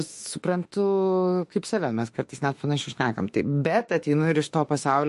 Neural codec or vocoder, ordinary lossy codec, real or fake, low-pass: autoencoder, 48 kHz, 32 numbers a frame, DAC-VAE, trained on Japanese speech; MP3, 48 kbps; fake; 14.4 kHz